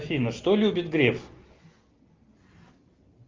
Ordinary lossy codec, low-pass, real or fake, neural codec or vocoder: Opus, 32 kbps; 7.2 kHz; real; none